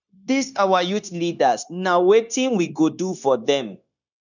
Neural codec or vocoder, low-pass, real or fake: codec, 16 kHz, 0.9 kbps, LongCat-Audio-Codec; 7.2 kHz; fake